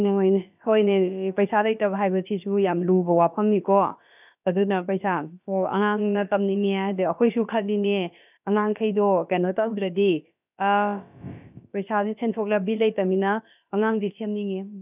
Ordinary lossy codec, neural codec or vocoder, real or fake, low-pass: none; codec, 16 kHz, about 1 kbps, DyCAST, with the encoder's durations; fake; 3.6 kHz